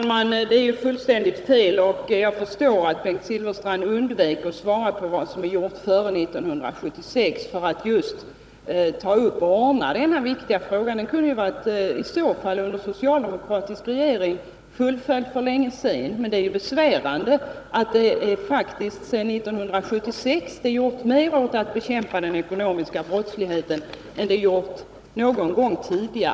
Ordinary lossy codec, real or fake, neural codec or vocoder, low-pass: none; fake; codec, 16 kHz, 16 kbps, FunCodec, trained on Chinese and English, 50 frames a second; none